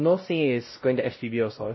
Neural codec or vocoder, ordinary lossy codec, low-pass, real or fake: codec, 16 kHz, 0.5 kbps, X-Codec, WavLM features, trained on Multilingual LibriSpeech; MP3, 24 kbps; 7.2 kHz; fake